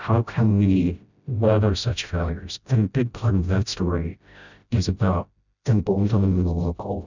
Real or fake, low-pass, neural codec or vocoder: fake; 7.2 kHz; codec, 16 kHz, 0.5 kbps, FreqCodec, smaller model